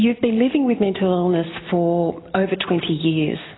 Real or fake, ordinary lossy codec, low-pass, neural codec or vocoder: real; AAC, 16 kbps; 7.2 kHz; none